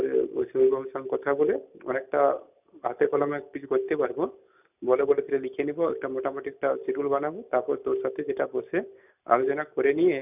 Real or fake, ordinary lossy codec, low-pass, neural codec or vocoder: real; none; 3.6 kHz; none